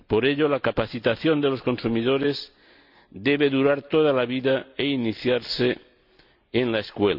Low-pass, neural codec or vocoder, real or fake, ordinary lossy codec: 5.4 kHz; none; real; none